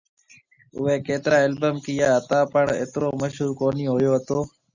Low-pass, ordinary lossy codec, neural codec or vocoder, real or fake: 7.2 kHz; Opus, 64 kbps; none; real